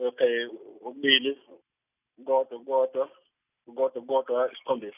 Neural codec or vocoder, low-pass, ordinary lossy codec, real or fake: none; 3.6 kHz; none; real